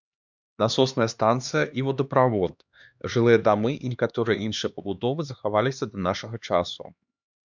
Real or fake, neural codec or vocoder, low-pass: fake; codec, 16 kHz, 2 kbps, X-Codec, HuBERT features, trained on LibriSpeech; 7.2 kHz